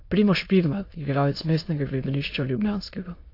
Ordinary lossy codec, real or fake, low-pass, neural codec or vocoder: AAC, 32 kbps; fake; 5.4 kHz; autoencoder, 22.05 kHz, a latent of 192 numbers a frame, VITS, trained on many speakers